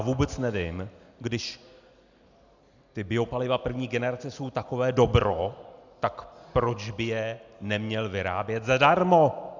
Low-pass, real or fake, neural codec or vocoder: 7.2 kHz; real; none